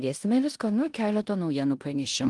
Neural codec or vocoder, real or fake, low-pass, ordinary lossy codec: codec, 16 kHz in and 24 kHz out, 0.9 kbps, LongCat-Audio-Codec, four codebook decoder; fake; 10.8 kHz; Opus, 24 kbps